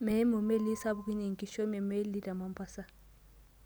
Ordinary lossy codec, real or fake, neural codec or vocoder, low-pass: none; real; none; none